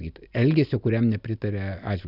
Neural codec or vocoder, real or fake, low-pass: none; real; 5.4 kHz